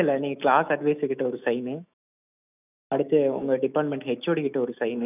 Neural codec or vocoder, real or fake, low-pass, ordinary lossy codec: autoencoder, 48 kHz, 128 numbers a frame, DAC-VAE, trained on Japanese speech; fake; 3.6 kHz; none